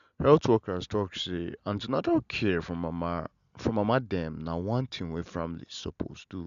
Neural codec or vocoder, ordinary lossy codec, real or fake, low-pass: none; MP3, 96 kbps; real; 7.2 kHz